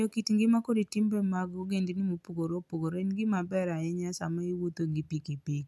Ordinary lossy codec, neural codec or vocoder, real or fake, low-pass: none; none; real; none